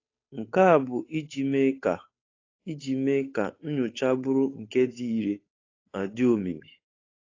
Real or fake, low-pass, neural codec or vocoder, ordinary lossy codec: fake; 7.2 kHz; codec, 16 kHz, 8 kbps, FunCodec, trained on Chinese and English, 25 frames a second; AAC, 32 kbps